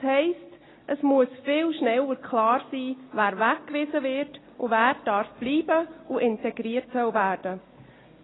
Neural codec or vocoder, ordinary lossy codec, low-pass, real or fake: none; AAC, 16 kbps; 7.2 kHz; real